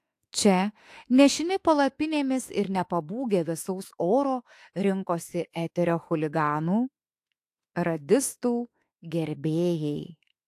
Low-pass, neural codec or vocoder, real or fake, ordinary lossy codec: 14.4 kHz; autoencoder, 48 kHz, 32 numbers a frame, DAC-VAE, trained on Japanese speech; fake; AAC, 64 kbps